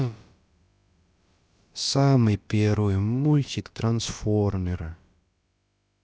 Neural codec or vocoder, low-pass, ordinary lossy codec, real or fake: codec, 16 kHz, about 1 kbps, DyCAST, with the encoder's durations; none; none; fake